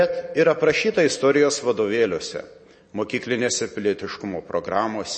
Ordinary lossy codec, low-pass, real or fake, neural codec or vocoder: MP3, 32 kbps; 9.9 kHz; real; none